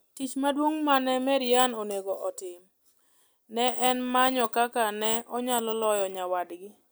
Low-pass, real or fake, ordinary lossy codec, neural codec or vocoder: none; real; none; none